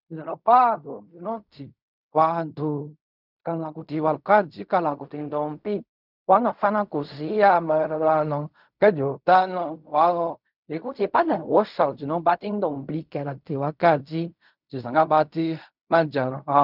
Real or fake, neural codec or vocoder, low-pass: fake; codec, 16 kHz in and 24 kHz out, 0.4 kbps, LongCat-Audio-Codec, fine tuned four codebook decoder; 5.4 kHz